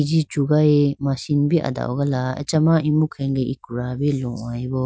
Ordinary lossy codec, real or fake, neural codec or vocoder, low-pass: none; real; none; none